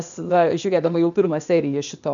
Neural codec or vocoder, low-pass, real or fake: codec, 16 kHz, 0.8 kbps, ZipCodec; 7.2 kHz; fake